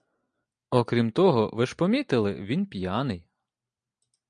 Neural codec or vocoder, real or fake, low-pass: none; real; 9.9 kHz